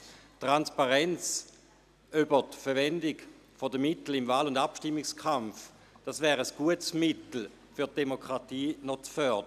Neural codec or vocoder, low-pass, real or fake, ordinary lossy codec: none; 14.4 kHz; real; none